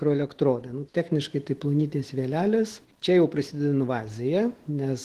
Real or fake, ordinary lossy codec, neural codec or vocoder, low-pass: fake; Opus, 16 kbps; autoencoder, 48 kHz, 128 numbers a frame, DAC-VAE, trained on Japanese speech; 14.4 kHz